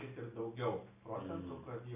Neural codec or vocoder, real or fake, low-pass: none; real; 3.6 kHz